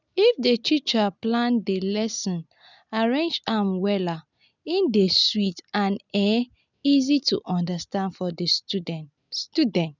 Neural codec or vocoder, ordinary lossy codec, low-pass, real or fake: none; none; 7.2 kHz; real